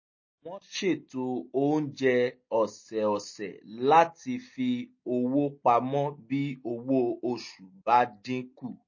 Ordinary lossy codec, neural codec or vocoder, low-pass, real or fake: MP3, 32 kbps; none; 7.2 kHz; real